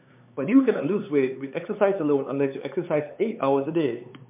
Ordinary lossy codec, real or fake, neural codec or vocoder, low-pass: MP3, 32 kbps; fake; codec, 16 kHz, 4 kbps, X-Codec, HuBERT features, trained on LibriSpeech; 3.6 kHz